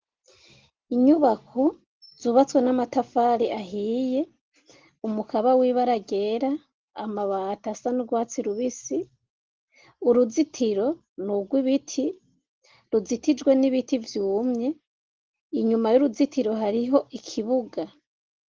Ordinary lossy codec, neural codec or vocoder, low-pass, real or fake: Opus, 16 kbps; none; 7.2 kHz; real